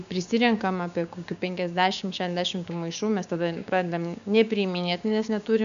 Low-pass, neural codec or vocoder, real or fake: 7.2 kHz; codec, 16 kHz, 6 kbps, DAC; fake